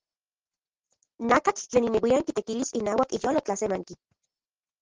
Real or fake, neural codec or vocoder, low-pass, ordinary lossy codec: real; none; 7.2 kHz; Opus, 16 kbps